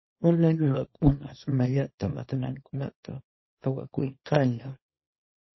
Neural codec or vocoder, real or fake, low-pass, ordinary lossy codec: codec, 24 kHz, 0.9 kbps, WavTokenizer, small release; fake; 7.2 kHz; MP3, 24 kbps